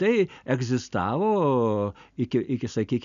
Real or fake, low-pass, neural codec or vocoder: real; 7.2 kHz; none